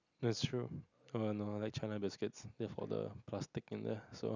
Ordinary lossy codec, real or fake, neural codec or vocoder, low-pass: none; real; none; 7.2 kHz